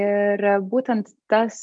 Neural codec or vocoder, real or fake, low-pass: none; real; 9.9 kHz